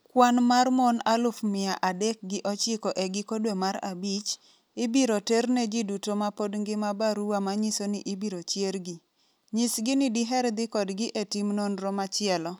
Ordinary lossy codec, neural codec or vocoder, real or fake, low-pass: none; none; real; none